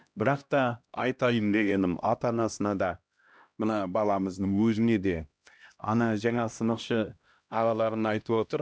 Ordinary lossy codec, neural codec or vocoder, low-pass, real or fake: none; codec, 16 kHz, 1 kbps, X-Codec, HuBERT features, trained on LibriSpeech; none; fake